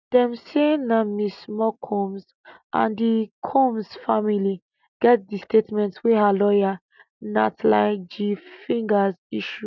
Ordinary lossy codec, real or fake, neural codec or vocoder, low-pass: none; real; none; 7.2 kHz